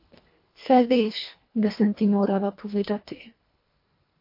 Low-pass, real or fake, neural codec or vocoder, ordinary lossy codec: 5.4 kHz; fake; codec, 24 kHz, 1.5 kbps, HILCodec; MP3, 32 kbps